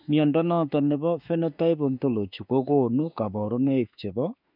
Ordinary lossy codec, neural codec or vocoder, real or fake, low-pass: none; autoencoder, 48 kHz, 32 numbers a frame, DAC-VAE, trained on Japanese speech; fake; 5.4 kHz